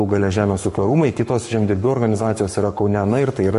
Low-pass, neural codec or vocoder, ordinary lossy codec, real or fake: 14.4 kHz; codec, 44.1 kHz, 7.8 kbps, Pupu-Codec; MP3, 48 kbps; fake